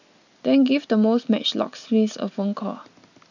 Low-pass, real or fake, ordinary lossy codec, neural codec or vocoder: 7.2 kHz; real; none; none